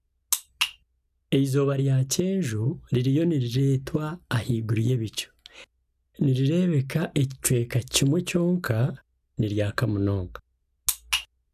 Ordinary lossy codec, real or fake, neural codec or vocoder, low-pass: none; real; none; 14.4 kHz